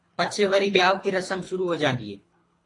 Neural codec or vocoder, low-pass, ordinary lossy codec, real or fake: codec, 24 kHz, 3 kbps, HILCodec; 10.8 kHz; AAC, 32 kbps; fake